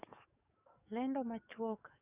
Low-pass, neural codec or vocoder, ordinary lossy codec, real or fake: 3.6 kHz; codec, 44.1 kHz, 7.8 kbps, DAC; none; fake